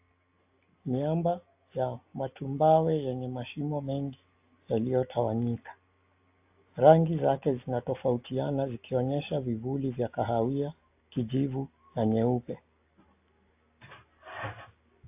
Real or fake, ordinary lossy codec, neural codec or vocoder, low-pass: real; AAC, 32 kbps; none; 3.6 kHz